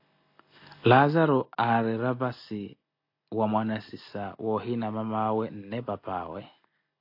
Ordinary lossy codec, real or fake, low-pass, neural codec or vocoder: AAC, 32 kbps; real; 5.4 kHz; none